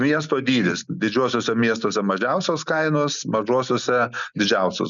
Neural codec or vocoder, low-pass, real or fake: none; 7.2 kHz; real